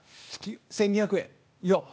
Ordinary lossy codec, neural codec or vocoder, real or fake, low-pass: none; codec, 16 kHz, 0.8 kbps, ZipCodec; fake; none